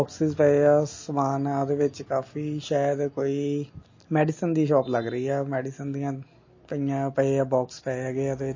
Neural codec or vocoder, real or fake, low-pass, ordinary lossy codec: none; real; 7.2 kHz; MP3, 32 kbps